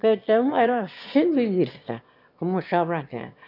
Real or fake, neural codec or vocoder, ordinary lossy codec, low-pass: fake; autoencoder, 22.05 kHz, a latent of 192 numbers a frame, VITS, trained on one speaker; none; 5.4 kHz